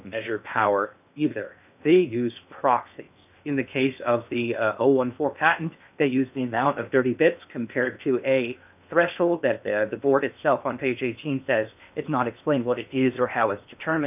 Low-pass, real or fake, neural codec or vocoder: 3.6 kHz; fake; codec, 16 kHz in and 24 kHz out, 0.8 kbps, FocalCodec, streaming, 65536 codes